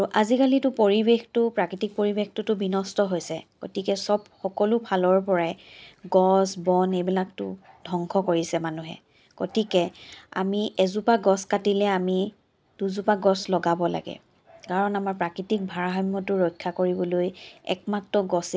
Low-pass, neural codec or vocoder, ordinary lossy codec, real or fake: none; none; none; real